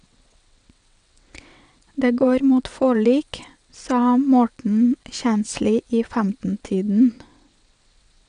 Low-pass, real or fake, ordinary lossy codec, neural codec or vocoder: 9.9 kHz; fake; none; vocoder, 22.05 kHz, 80 mel bands, WaveNeXt